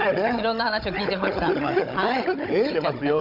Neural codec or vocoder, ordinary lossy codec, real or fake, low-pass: codec, 16 kHz, 16 kbps, FunCodec, trained on Chinese and English, 50 frames a second; none; fake; 5.4 kHz